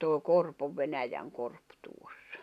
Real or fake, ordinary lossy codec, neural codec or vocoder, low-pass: real; none; none; 14.4 kHz